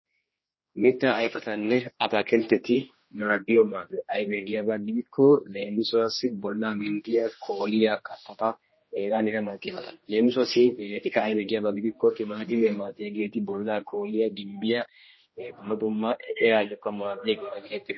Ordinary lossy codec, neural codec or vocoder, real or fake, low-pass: MP3, 24 kbps; codec, 16 kHz, 1 kbps, X-Codec, HuBERT features, trained on general audio; fake; 7.2 kHz